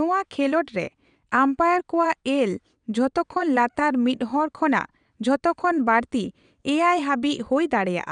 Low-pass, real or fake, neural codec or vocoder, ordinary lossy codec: 9.9 kHz; fake; vocoder, 22.05 kHz, 80 mel bands, WaveNeXt; none